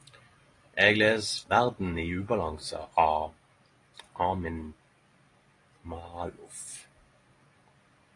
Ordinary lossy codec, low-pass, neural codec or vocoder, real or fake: AAC, 32 kbps; 10.8 kHz; none; real